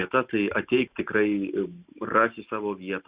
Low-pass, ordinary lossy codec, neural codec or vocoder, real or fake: 3.6 kHz; Opus, 64 kbps; none; real